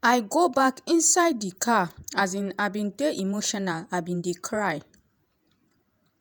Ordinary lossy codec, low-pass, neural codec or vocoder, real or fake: none; none; vocoder, 48 kHz, 128 mel bands, Vocos; fake